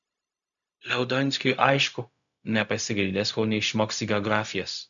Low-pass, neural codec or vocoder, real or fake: 7.2 kHz; codec, 16 kHz, 0.4 kbps, LongCat-Audio-Codec; fake